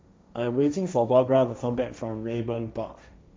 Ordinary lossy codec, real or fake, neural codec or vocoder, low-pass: none; fake; codec, 16 kHz, 1.1 kbps, Voila-Tokenizer; none